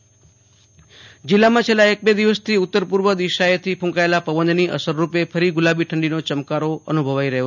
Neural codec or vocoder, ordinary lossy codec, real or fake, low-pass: none; none; real; 7.2 kHz